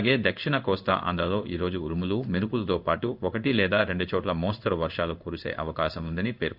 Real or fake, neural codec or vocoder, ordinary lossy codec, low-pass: fake; codec, 16 kHz in and 24 kHz out, 1 kbps, XY-Tokenizer; none; 5.4 kHz